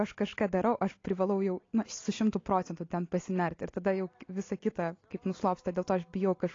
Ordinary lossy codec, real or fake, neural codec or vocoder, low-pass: AAC, 32 kbps; real; none; 7.2 kHz